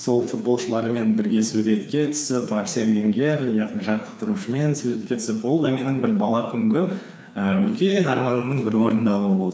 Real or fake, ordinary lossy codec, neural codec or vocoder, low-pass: fake; none; codec, 16 kHz, 2 kbps, FreqCodec, larger model; none